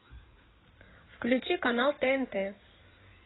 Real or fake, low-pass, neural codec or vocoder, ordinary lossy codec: fake; 7.2 kHz; codec, 16 kHz in and 24 kHz out, 2.2 kbps, FireRedTTS-2 codec; AAC, 16 kbps